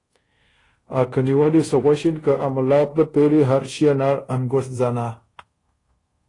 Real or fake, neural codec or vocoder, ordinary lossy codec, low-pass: fake; codec, 24 kHz, 0.5 kbps, DualCodec; AAC, 32 kbps; 10.8 kHz